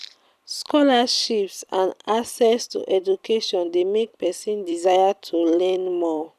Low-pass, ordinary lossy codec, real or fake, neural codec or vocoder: 14.4 kHz; none; fake; vocoder, 44.1 kHz, 128 mel bands every 256 samples, BigVGAN v2